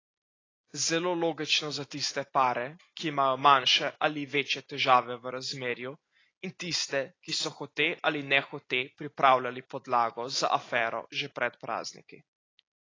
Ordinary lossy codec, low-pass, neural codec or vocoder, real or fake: AAC, 32 kbps; 7.2 kHz; none; real